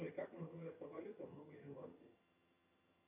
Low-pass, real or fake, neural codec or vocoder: 3.6 kHz; fake; vocoder, 22.05 kHz, 80 mel bands, HiFi-GAN